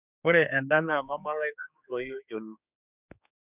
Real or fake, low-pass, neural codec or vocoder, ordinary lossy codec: fake; 3.6 kHz; codec, 16 kHz, 4 kbps, X-Codec, HuBERT features, trained on general audio; none